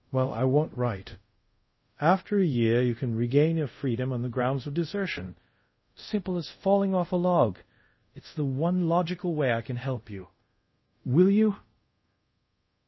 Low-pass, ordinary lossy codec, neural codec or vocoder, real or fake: 7.2 kHz; MP3, 24 kbps; codec, 24 kHz, 0.5 kbps, DualCodec; fake